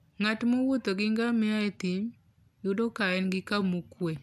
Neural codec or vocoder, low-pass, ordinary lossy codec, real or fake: none; none; none; real